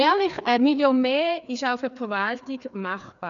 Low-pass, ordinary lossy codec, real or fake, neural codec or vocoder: 7.2 kHz; none; fake; codec, 16 kHz, 2 kbps, FreqCodec, larger model